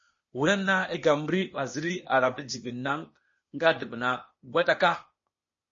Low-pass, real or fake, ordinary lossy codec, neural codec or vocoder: 7.2 kHz; fake; MP3, 32 kbps; codec, 16 kHz, 0.8 kbps, ZipCodec